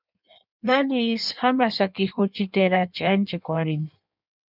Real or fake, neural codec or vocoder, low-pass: fake; codec, 16 kHz in and 24 kHz out, 1.1 kbps, FireRedTTS-2 codec; 5.4 kHz